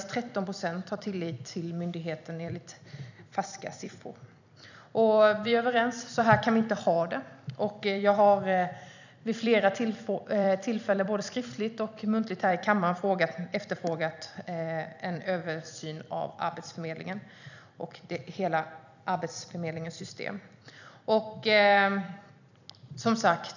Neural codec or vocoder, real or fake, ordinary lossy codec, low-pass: vocoder, 44.1 kHz, 128 mel bands every 256 samples, BigVGAN v2; fake; none; 7.2 kHz